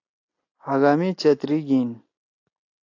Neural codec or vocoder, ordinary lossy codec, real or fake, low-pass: none; AAC, 48 kbps; real; 7.2 kHz